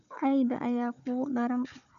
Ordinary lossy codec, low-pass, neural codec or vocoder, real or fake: none; 7.2 kHz; codec, 16 kHz, 4 kbps, FunCodec, trained on Chinese and English, 50 frames a second; fake